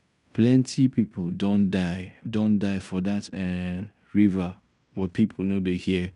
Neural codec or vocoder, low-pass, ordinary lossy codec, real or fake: codec, 16 kHz in and 24 kHz out, 0.9 kbps, LongCat-Audio-Codec, fine tuned four codebook decoder; 10.8 kHz; none; fake